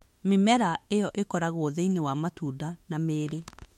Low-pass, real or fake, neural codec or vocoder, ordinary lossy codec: 19.8 kHz; fake; autoencoder, 48 kHz, 32 numbers a frame, DAC-VAE, trained on Japanese speech; MP3, 64 kbps